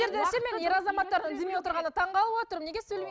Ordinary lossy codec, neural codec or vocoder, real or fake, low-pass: none; none; real; none